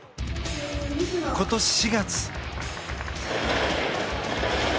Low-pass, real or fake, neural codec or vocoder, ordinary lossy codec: none; real; none; none